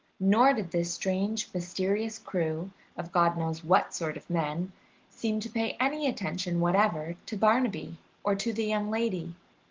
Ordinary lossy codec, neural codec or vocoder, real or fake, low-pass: Opus, 16 kbps; none; real; 7.2 kHz